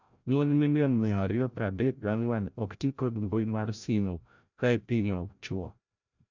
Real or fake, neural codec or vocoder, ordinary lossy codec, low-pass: fake; codec, 16 kHz, 0.5 kbps, FreqCodec, larger model; none; 7.2 kHz